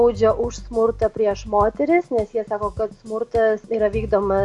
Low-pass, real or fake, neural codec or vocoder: 10.8 kHz; real; none